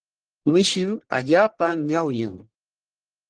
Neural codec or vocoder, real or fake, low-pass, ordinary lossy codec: codec, 44.1 kHz, 1.7 kbps, Pupu-Codec; fake; 9.9 kHz; Opus, 16 kbps